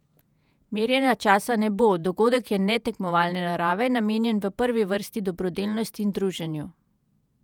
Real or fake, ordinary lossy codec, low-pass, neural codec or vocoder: fake; none; 19.8 kHz; vocoder, 48 kHz, 128 mel bands, Vocos